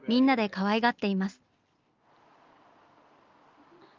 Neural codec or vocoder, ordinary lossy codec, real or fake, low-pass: none; Opus, 32 kbps; real; 7.2 kHz